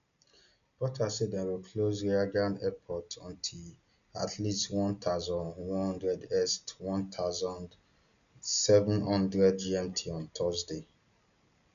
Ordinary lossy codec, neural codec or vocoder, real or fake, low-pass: none; none; real; 7.2 kHz